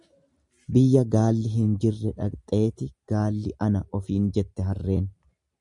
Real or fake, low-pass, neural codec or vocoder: real; 10.8 kHz; none